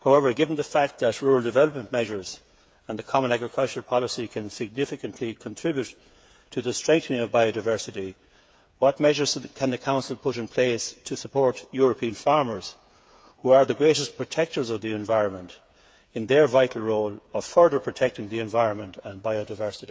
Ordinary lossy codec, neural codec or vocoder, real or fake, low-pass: none; codec, 16 kHz, 8 kbps, FreqCodec, smaller model; fake; none